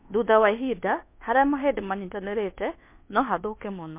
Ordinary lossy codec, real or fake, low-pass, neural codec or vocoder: MP3, 24 kbps; fake; 3.6 kHz; codec, 24 kHz, 1.2 kbps, DualCodec